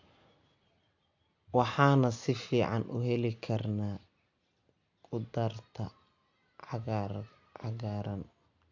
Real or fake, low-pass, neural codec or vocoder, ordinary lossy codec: real; 7.2 kHz; none; AAC, 48 kbps